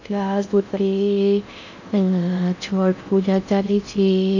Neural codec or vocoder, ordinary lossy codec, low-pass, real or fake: codec, 16 kHz in and 24 kHz out, 0.6 kbps, FocalCodec, streaming, 2048 codes; none; 7.2 kHz; fake